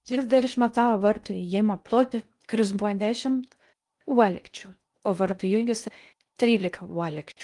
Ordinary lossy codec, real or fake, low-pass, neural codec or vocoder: Opus, 32 kbps; fake; 10.8 kHz; codec, 16 kHz in and 24 kHz out, 0.6 kbps, FocalCodec, streaming, 2048 codes